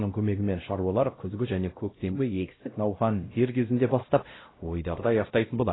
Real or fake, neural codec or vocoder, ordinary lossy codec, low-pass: fake; codec, 16 kHz, 0.5 kbps, X-Codec, WavLM features, trained on Multilingual LibriSpeech; AAC, 16 kbps; 7.2 kHz